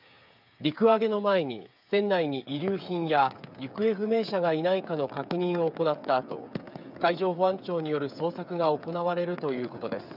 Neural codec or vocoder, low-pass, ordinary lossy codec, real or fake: codec, 16 kHz, 8 kbps, FreqCodec, smaller model; 5.4 kHz; none; fake